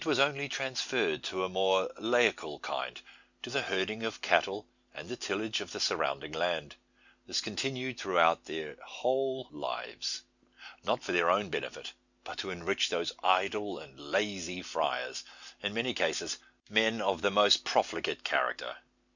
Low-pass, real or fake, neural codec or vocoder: 7.2 kHz; real; none